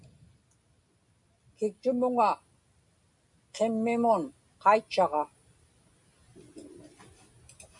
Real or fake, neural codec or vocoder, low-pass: real; none; 10.8 kHz